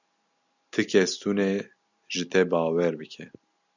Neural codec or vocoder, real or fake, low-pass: none; real; 7.2 kHz